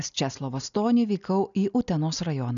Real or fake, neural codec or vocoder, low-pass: real; none; 7.2 kHz